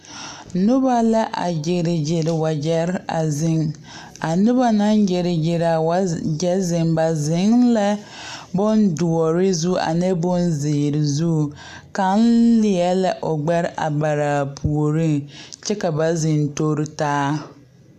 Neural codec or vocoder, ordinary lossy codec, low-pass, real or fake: none; AAC, 96 kbps; 14.4 kHz; real